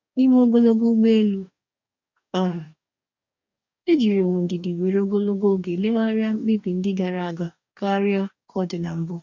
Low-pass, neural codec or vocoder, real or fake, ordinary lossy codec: 7.2 kHz; codec, 44.1 kHz, 2.6 kbps, DAC; fake; none